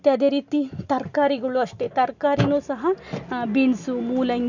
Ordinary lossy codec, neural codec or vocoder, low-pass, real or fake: none; none; 7.2 kHz; real